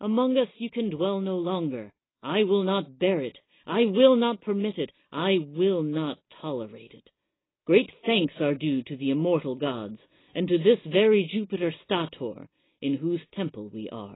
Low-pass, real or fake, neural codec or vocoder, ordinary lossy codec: 7.2 kHz; real; none; AAC, 16 kbps